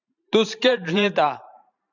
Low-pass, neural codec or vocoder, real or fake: 7.2 kHz; vocoder, 44.1 kHz, 128 mel bands every 512 samples, BigVGAN v2; fake